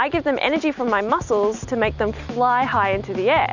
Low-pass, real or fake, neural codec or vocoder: 7.2 kHz; real; none